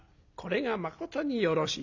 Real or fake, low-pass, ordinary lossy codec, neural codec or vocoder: real; 7.2 kHz; none; none